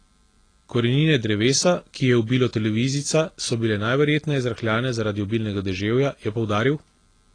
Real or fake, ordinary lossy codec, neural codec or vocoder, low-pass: real; AAC, 32 kbps; none; 9.9 kHz